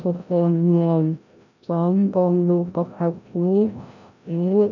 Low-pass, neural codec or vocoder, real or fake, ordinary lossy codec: 7.2 kHz; codec, 16 kHz, 0.5 kbps, FreqCodec, larger model; fake; none